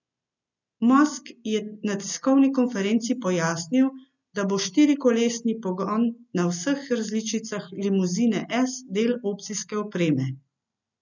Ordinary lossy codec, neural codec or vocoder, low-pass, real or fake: none; none; 7.2 kHz; real